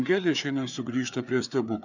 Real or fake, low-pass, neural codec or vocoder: fake; 7.2 kHz; codec, 16 kHz, 4 kbps, FreqCodec, larger model